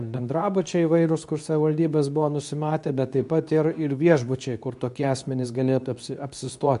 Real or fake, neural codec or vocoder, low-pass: fake; codec, 24 kHz, 0.9 kbps, WavTokenizer, medium speech release version 2; 10.8 kHz